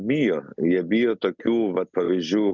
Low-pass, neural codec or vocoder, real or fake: 7.2 kHz; none; real